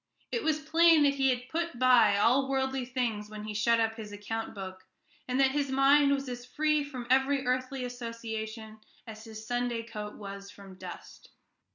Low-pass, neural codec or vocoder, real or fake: 7.2 kHz; none; real